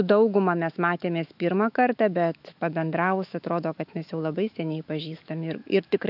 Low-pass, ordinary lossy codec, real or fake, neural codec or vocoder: 5.4 kHz; AAC, 48 kbps; fake; autoencoder, 48 kHz, 128 numbers a frame, DAC-VAE, trained on Japanese speech